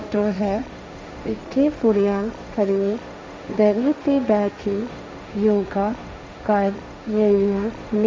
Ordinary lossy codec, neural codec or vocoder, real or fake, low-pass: none; codec, 16 kHz, 1.1 kbps, Voila-Tokenizer; fake; none